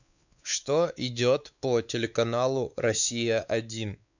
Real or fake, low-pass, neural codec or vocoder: fake; 7.2 kHz; codec, 16 kHz, 4 kbps, X-Codec, WavLM features, trained on Multilingual LibriSpeech